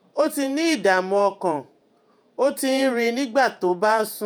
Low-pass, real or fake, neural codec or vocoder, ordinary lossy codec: none; fake; vocoder, 48 kHz, 128 mel bands, Vocos; none